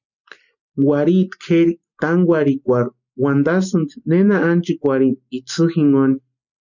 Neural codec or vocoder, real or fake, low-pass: none; real; 7.2 kHz